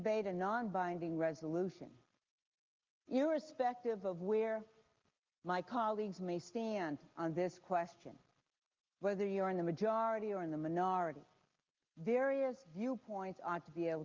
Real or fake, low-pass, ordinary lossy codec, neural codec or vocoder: real; 7.2 kHz; Opus, 32 kbps; none